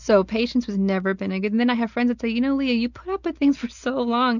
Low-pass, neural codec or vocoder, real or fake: 7.2 kHz; none; real